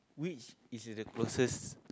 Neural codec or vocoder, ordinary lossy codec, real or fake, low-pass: none; none; real; none